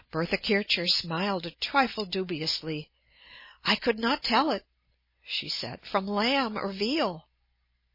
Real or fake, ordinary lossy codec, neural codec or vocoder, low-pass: real; MP3, 24 kbps; none; 5.4 kHz